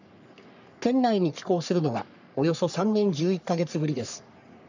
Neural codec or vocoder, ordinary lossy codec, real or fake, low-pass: codec, 44.1 kHz, 3.4 kbps, Pupu-Codec; none; fake; 7.2 kHz